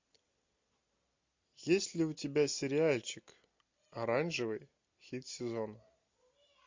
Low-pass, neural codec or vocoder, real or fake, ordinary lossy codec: 7.2 kHz; none; real; MP3, 48 kbps